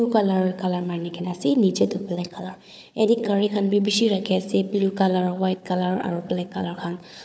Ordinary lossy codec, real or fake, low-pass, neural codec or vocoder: none; fake; none; codec, 16 kHz, 4 kbps, FunCodec, trained on Chinese and English, 50 frames a second